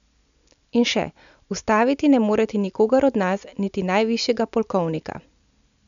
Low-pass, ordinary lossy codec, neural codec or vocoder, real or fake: 7.2 kHz; MP3, 96 kbps; none; real